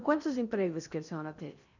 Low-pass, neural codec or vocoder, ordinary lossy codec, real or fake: 7.2 kHz; codec, 16 kHz in and 24 kHz out, 0.8 kbps, FocalCodec, streaming, 65536 codes; none; fake